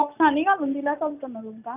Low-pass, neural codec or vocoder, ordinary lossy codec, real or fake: 3.6 kHz; none; none; real